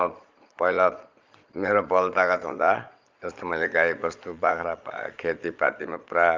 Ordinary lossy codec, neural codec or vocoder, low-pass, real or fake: Opus, 24 kbps; vocoder, 22.05 kHz, 80 mel bands, Vocos; 7.2 kHz; fake